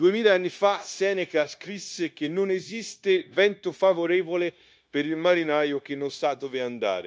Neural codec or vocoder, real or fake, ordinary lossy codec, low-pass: codec, 16 kHz, 0.9 kbps, LongCat-Audio-Codec; fake; none; none